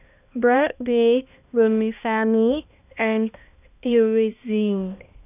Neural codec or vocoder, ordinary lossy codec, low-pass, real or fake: codec, 16 kHz, 1 kbps, X-Codec, HuBERT features, trained on balanced general audio; none; 3.6 kHz; fake